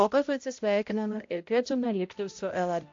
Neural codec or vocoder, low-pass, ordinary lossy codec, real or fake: codec, 16 kHz, 0.5 kbps, X-Codec, HuBERT features, trained on balanced general audio; 7.2 kHz; AAC, 48 kbps; fake